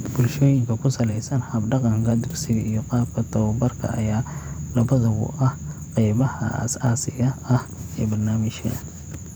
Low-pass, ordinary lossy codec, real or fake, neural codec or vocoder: none; none; fake; vocoder, 44.1 kHz, 128 mel bands every 512 samples, BigVGAN v2